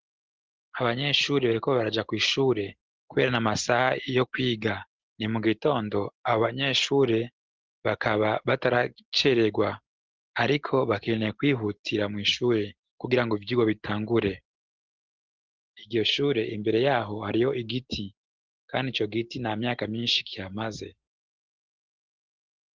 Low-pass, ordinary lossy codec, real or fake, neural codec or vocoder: 7.2 kHz; Opus, 16 kbps; real; none